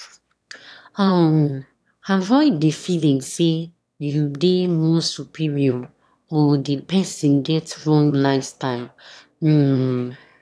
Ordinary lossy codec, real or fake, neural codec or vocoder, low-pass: none; fake; autoencoder, 22.05 kHz, a latent of 192 numbers a frame, VITS, trained on one speaker; none